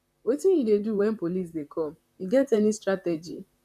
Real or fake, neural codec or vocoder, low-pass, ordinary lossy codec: fake; vocoder, 44.1 kHz, 128 mel bands, Pupu-Vocoder; 14.4 kHz; none